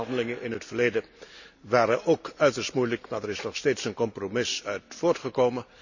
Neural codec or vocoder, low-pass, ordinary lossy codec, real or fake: none; 7.2 kHz; none; real